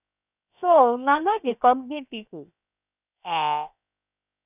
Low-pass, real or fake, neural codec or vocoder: 3.6 kHz; fake; codec, 16 kHz, 0.7 kbps, FocalCodec